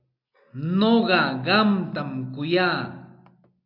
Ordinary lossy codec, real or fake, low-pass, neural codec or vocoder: AAC, 48 kbps; real; 5.4 kHz; none